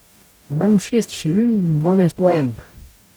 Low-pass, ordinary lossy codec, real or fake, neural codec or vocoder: none; none; fake; codec, 44.1 kHz, 0.9 kbps, DAC